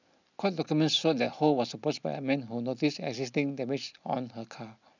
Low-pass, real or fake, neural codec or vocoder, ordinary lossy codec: 7.2 kHz; real; none; none